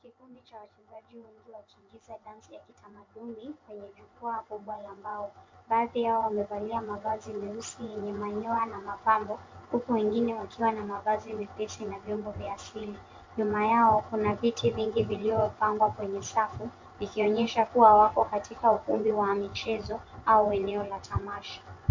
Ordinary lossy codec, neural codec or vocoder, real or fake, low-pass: MP3, 48 kbps; vocoder, 24 kHz, 100 mel bands, Vocos; fake; 7.2 kHz